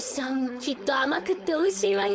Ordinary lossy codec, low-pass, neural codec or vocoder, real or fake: none; none; codec, 16 kHz, 4.8 kbps, FACodec; fake